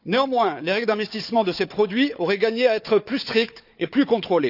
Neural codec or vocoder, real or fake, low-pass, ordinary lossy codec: codec, 16 kHz, 4 kbps, FunCodec, trained on Chinese and English, 50 frames a second; fake; 5.4 kHz; none